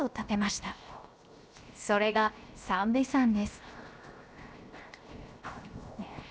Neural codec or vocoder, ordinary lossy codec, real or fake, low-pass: codec, 16 kHz, 0.7 kbps, FocalCodec; none; fake; none